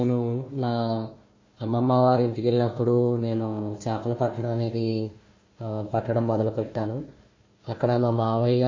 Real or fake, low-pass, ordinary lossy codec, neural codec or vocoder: fake; 7.2 kHz; MP3, 32 kbps; codec, 16 kHz, 1 kbps, FunCodec, trained on Chinese and English, 50 frames a second